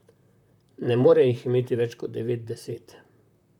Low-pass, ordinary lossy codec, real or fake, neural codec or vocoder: 19.8 kHz; none; fake; vocoder, 44.1 kHz, 128 mel bands, Pupu-Vocoder